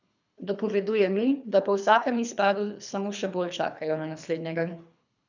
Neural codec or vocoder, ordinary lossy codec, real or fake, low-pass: codec, 24 kHz, 3 kbps, HILCodec; none; fake; 7.2 kHz